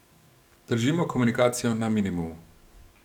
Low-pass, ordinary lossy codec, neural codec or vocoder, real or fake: 19.8 kHz; none; codec, 44.1 kHz, 7.8 kbps, DAC; fake